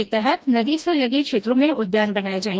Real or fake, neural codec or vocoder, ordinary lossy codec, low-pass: fake; codec, 16 kHz, 1 kbps, FreqCodec, smaller model; none; none